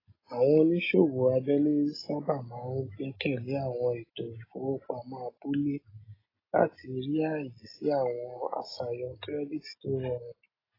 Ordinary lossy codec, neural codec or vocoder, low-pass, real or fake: AAC, 24 kbps; none; 5.4 kHz; real